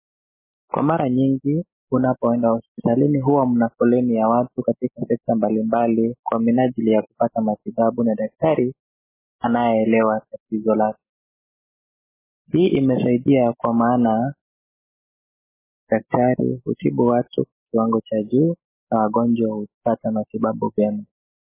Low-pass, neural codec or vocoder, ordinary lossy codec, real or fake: 3.6 kHz; none; MP3, 16 kbps; real